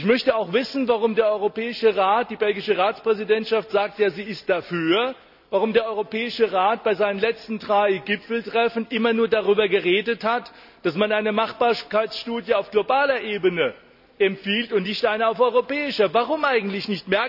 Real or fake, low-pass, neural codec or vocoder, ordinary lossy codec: real; 5.4 kHz; none; none